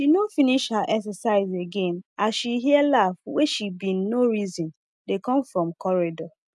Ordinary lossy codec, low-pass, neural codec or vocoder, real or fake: none; none; none; real